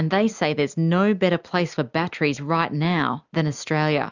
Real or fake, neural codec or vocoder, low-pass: real; none; 7.2 kHz